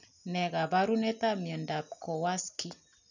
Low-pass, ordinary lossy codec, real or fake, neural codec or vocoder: 7.2 kHz; none; real; none